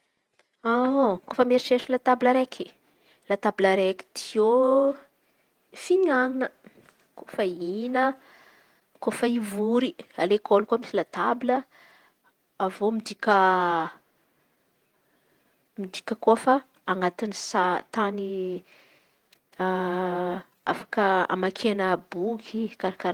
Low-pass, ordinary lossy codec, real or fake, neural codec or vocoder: 19.8 kHz; Opus, 24 kbps; fake; vocoder, 48 kHz, 128 mel bands, Vocos